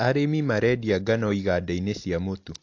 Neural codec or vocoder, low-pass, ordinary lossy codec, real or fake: none; 7.2 kHz; AAC, 48 kbps; real